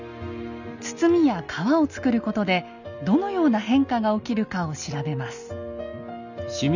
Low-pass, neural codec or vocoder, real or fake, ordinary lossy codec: 7.2 kHz; none; real; none